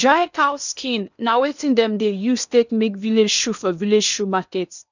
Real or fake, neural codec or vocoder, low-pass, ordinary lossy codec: fake; codec, 16 kHz in and 24 kHz out, 0.8 kbps, FocalCodec, streaming, 65536 codes; 7.2 kHz; none